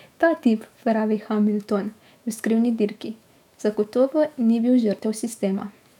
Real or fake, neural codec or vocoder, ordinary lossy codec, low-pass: fake; autoencoder, 48 kHz, 128 numbers a frame, DAC-VAE, trained on Japanese speech; none; 19.8 kHz